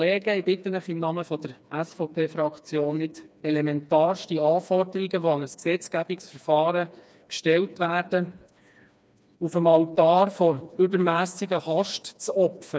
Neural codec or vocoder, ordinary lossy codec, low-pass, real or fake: codec, 16 kHz, 2 kbps, FreqCodec, smaller model; none; none; fake